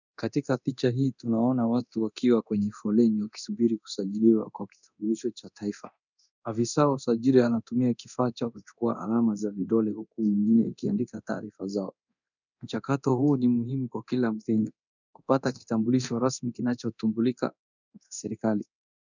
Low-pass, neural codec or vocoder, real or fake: 7.2 kHz; codec, 24 kHz, 0.9 kbps, DualCodec; fake